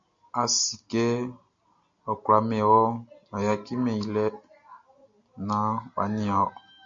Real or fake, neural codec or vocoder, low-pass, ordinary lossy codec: real; none; 7.2 kHz; MP3, 48 kbps